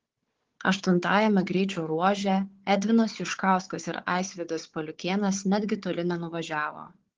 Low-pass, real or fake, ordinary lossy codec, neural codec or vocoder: 7.2 kHz; fake; Opus, 16 kbps; codec, 16 kHz, 4 kbps, FunCodec, trained on Chinese and English, 50 frames a second